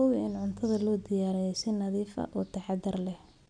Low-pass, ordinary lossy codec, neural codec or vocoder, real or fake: 9.9 kHz; none; none; real